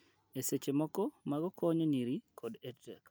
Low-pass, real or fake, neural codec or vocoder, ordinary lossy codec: none; real; none; none